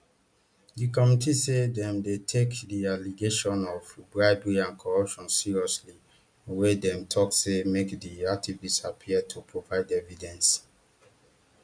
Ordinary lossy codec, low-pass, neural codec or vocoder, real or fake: none; 9.9 kHz; none; real